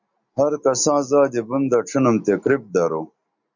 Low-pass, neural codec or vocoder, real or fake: 7.2 kHz; none; real